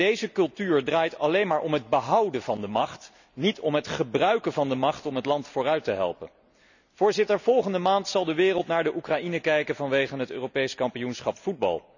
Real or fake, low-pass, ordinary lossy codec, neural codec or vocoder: real; 7.2 kHz; none; none